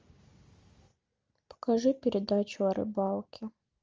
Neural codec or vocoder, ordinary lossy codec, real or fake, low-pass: none; Opus, 32 kbps; real; 7.2 kHz